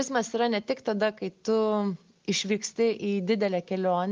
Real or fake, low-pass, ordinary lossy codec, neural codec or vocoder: real; 7.2 kHz; Opus, 24 kbps; none